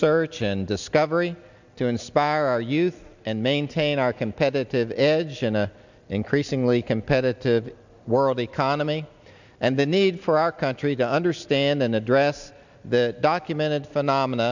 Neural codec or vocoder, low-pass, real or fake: none; 7.2 kHz; real